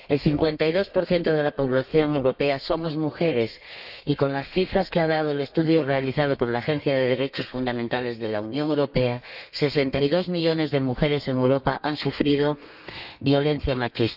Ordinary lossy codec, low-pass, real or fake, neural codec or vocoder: none; 5.4 kHz; fake; codec, 32 kHz, 1.9 kbps, SNAC